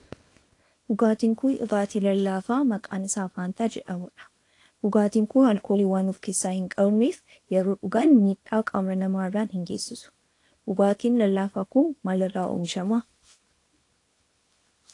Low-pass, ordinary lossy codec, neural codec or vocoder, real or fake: 10.8 kHz; AAC, 48 kbps; codec, 24 kHz, 0.9 kbps, WavTokenizer, small release; fake